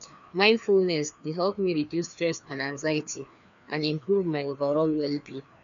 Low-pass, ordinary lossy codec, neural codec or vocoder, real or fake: 7.2 kHz; none; codec, 16 kHz, 2 kbps, FreqCodec, larger model; fake